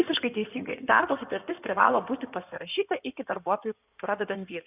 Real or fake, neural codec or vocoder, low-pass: fake; codec, 16 kHz in and 24 kHz out, 2.2 kbps, FireRedTTS-2 codec; 3.6 kHz